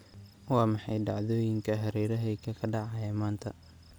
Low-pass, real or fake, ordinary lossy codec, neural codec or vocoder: none; real; none; none